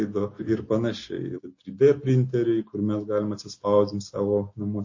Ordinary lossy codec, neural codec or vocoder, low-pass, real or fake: MP3, 32 kbps; none; 7.2 kHz; real